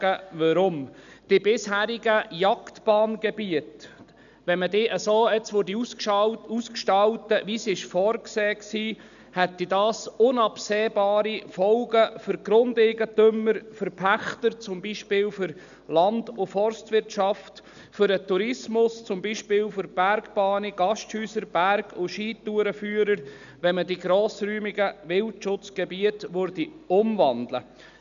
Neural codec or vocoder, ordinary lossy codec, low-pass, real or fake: none; none; 7.2 kHz; real